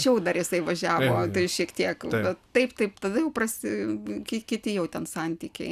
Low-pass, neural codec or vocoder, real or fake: 14.4 kHz; none; real